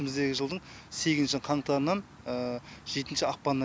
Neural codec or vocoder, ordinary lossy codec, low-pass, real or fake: none; none; none; real